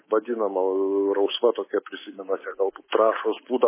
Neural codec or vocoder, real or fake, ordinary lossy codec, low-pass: codec, 24 kHz, 3.1 kbps, DualCodec; fake; MP3, 16 kbps; 3.6 kHz